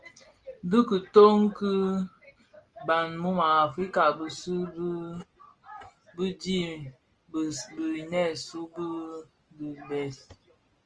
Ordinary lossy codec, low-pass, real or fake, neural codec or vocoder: Opus, 24 kbps; 9.9 kHz; real; none